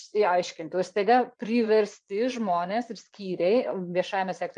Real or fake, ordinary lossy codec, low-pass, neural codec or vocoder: fake; MP3, 64 kbps; 9.9 kHz; vocoder, 22.05 kHz, 80 mel bands, Vocos